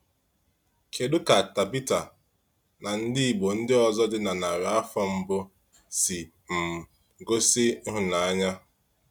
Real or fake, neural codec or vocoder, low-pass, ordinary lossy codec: real; none; none; none